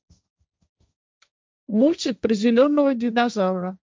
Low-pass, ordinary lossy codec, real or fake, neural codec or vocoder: none; none; fake; codec, 16 kHz, 1.1 kbps, Voila-Tokenizer